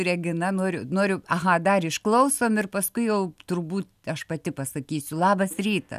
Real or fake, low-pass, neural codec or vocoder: real; 14.4 kHz; none